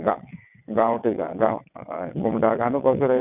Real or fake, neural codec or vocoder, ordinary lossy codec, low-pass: fake; vocoder, 22.05 kHz, 80 mel bands, WaveNeXt; none; 3.6 kHz